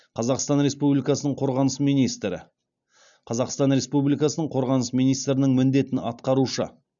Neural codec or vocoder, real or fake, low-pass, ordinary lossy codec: none; real; 7.2 kHz; none